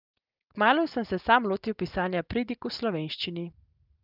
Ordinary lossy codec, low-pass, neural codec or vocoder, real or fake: Opus, 24 kbps; 5.4 kHz; none; real